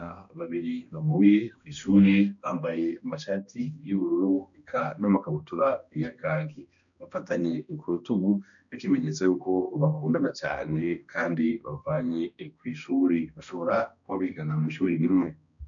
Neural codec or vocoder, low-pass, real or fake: codec, 16 kHz, 1 kbps, X-Codec, HuBERT features, trained on balanced general audio; 7.2 kHz; fake